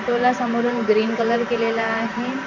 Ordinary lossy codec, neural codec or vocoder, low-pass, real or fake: none; vocoder, 44.1 kHz, 128 mel bands every 512 samples, BigVGAN v2; 7.2 kHz; fake